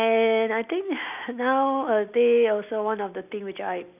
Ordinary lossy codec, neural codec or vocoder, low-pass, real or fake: none; none; 3.6 kHz; real